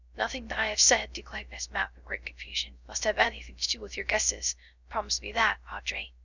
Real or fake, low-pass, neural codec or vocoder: fake; 7.2 kHz; codec, 16 kHz, 0.3 kbps, FocalCodec